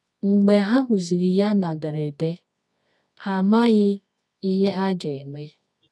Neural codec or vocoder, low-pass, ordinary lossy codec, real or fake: codec, 24 kHz, 0.9 kbps, WavTokenizer, medium music audio release; none; none; fake